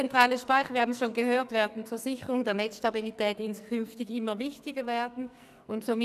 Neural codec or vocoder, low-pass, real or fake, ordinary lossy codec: codec, 44.1 kHz, 2.6 kbps, SNAC; 14.4 kHz; fake; none